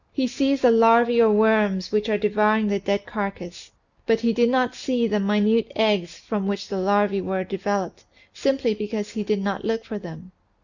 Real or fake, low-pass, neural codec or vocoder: real; 7.2 kHz; none